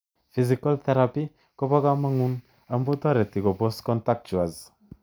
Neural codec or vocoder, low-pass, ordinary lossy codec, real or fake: none; none; none; real